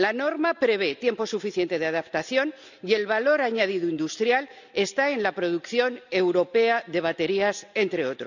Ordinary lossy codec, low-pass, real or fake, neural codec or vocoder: none; 7.2 kHz; real; none